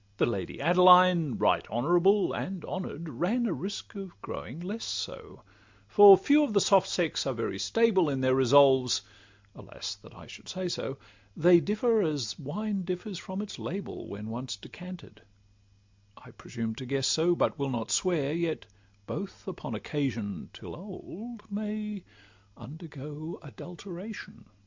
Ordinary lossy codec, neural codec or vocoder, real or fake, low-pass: MP3, 64 kbps; none; real; 7.2 kHz